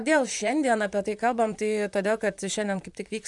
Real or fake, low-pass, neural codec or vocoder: fake; 10.8 kHz; vocoder, 44.1 kHz, 128 mel bands, Pupu-Vocoder